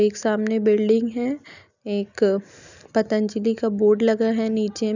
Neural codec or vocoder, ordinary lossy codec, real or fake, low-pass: none; none; real; 7.2 kHz